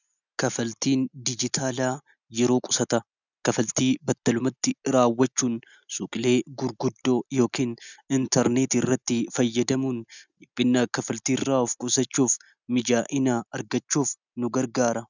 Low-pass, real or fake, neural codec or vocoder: 7.2 kHz; real; none